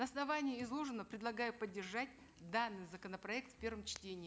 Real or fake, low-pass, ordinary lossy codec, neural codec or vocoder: real; none; none; none